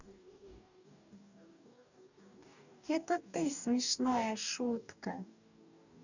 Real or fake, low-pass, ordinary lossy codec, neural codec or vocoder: fake; 7.2 kHz; none; codec, 44.1 kHz, 2.6 kbps, DAC